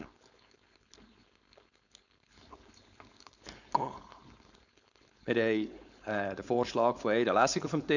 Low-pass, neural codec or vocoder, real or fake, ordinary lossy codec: 7.2 kHz; codec, 16 kHz, 4.8 kbps, FACodec; fake; none